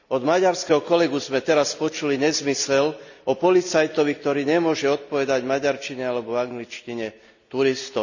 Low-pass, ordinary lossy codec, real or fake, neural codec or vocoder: 7.2 kHz; none; real; none